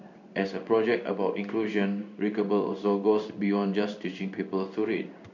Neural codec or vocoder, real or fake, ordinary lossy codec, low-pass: codec, 16 kHz in and 24 kHz out, 1 kbps, XY-Tokenizer; fake; none; 7.2 kHz